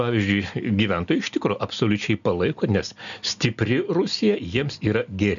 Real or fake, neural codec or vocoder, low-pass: real; none; 7.2 kHz